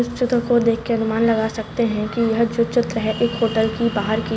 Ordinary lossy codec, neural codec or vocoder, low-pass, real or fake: none; none; none; real